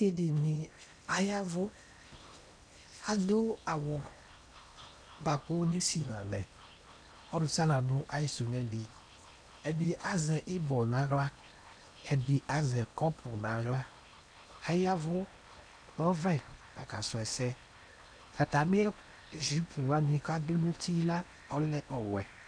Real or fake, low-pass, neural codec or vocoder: fake; 9.9 kHz; codec, 16 kHz in and 24 kHz out, 0.8 kbps, FocalCodec, streaming, 65536 codes